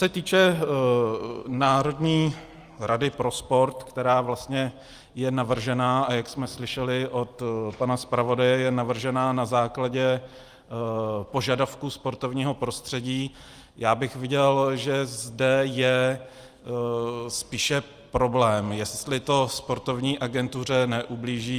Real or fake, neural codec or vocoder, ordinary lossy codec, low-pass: real; none; Opus, 32 kbps; 14.4 kHz